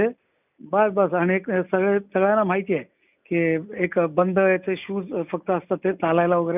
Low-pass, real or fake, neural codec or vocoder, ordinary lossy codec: 3.6 kHz; real; none; none